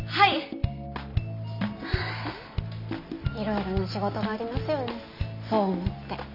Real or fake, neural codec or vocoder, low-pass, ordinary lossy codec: real; none; 5.4 kHz; none